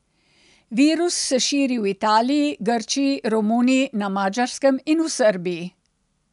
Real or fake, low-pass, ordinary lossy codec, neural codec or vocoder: real; 10.8 kHz; none; none